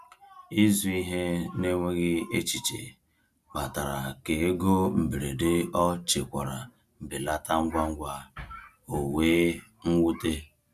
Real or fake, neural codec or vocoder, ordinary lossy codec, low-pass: real; none; none; 14.4 kHz